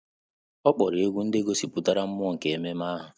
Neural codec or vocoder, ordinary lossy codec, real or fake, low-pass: none; none; real; none